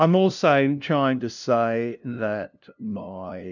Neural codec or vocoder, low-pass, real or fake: codec, 16 kHz, 0.5 kbps, FunCodec, trained on LibriTTS, 25 frames a second; 7.2 kHz; fake